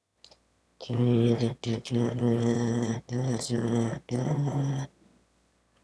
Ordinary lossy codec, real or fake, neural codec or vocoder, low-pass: none; fake; autoencoder, 22.05 kHz, a latent of 192 numbers a frame, VITS, trained on one speaker; none